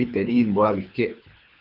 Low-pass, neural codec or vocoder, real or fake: 5.4 kHz; codec, 24 kHz, 3 kbps, HILCodec; fake